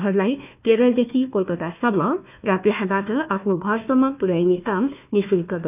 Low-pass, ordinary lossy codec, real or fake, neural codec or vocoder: 3.6 kHz; none; fake; codec, 16 kHz, 1 kbps, FunCodec, trained on Chinese and English, 50 frames a second